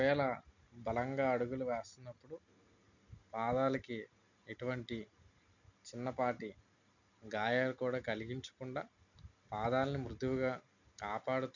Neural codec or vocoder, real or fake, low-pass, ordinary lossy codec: none; real; 7.2 kHz; none